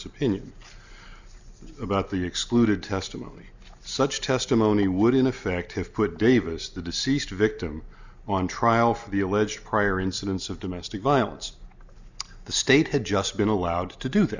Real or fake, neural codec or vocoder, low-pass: real; none; 7.2 kHz